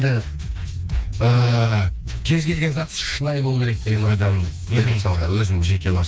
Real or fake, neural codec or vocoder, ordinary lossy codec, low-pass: fake; codec, 16 kHz, 2 kbps, FreqCodec, smaller model; none; none